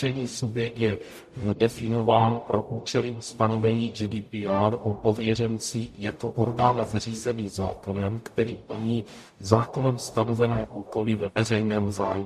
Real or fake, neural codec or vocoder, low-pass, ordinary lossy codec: fake; codec, 44.1 kHz, 0.9 kbps, DAC; 14.4 kHz; MP3, 64 kbps